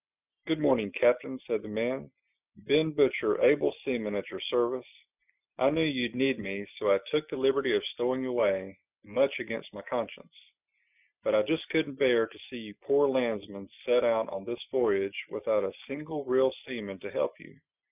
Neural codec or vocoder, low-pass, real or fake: none; 3.6 kHz; real